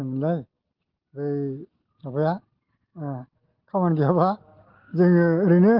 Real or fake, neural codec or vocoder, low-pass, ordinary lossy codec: real; none; 5.4 kHz; Opus, 16 kbps